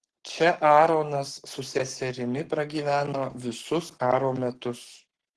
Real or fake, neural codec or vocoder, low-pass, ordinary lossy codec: fake; codec, 44.1 kHz, 7.8 kbps, Pupu-Codec; 10.8 kHz; Opus, 16 kbps